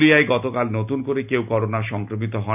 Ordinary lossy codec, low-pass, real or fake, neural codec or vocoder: none; 3.6 kHz; real; none